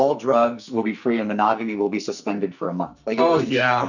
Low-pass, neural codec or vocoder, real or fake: 7.2 kHz; codec, 44.1 kHz, 2.6 kbps, SNAC; fake